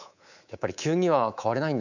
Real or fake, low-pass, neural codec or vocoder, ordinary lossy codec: fake; 7.2 kHz; codec, 24 kHz, 3.1 kbps, DualCodec; none